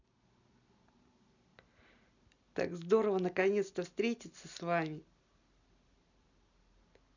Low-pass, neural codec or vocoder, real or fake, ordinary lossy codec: 7.2 kHz; none; real; none